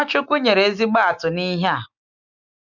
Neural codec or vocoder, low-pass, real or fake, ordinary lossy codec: none; 7.2 kHz; real; none